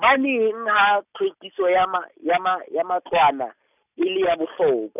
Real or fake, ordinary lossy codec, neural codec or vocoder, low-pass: real; none; none; 3.6 kHz